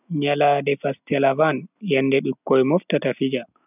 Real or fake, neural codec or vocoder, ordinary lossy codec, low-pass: real; none; none; 3.6 kHz